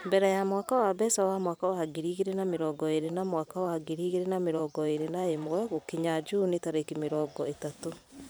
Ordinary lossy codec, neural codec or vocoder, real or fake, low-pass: none; vocoder, 44.1 kHz, 128 mel bands every 512 samples, BigVGAN v2; fake; none